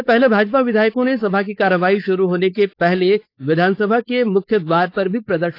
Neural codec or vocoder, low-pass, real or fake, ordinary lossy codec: codec, 16 kHz, 4.8 kbps, FACodec; 5.4 kHz; fake; AAC, 32 kbps